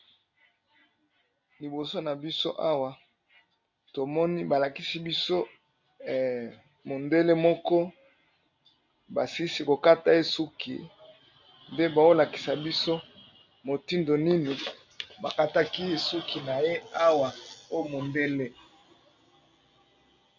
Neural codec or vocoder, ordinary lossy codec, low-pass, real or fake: none; MP3, 64 kbps; 7.2 kHz; real